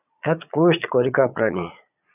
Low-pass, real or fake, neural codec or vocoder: 3.6 kHz; fake; vocoder, 22.05 kHz, 80 mel bands, Vocos